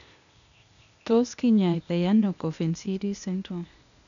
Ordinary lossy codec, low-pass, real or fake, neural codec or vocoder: none; 7.2 kHz; fake; codec, 16 kHz, 0.8 kbps, ZipCodec